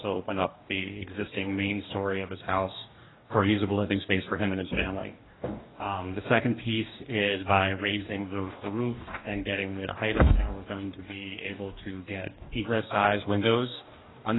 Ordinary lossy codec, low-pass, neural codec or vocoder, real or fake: AAC, 16 kbps; 7.2 kHz; codec, 44.1 kHz, 2.6 kbps, DAC; fake